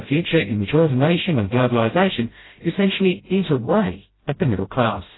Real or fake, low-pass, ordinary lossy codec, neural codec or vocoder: fake; 7.2 kHz; AAC, 16 kbps; codec, 16 kHz, 0.5 kbps, FreqCodec, smaller model